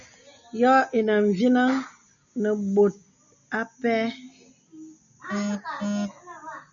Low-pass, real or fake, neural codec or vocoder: 7.2 kHz; real; none